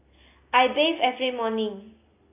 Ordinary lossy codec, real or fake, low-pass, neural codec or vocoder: AAC, 24 kbps; real; 3.6 kHz; none